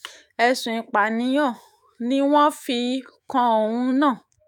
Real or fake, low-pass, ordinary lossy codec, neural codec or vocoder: fake; none; none; autoencoder, 48 kHz, 128 numbers a frame, DAC-VAE, trained on Japanese speech